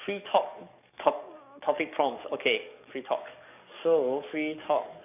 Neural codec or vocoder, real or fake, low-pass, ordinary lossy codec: codec, 44.1 kHz, 7.8 kbps, DAC; fake; 3.6 kHz; none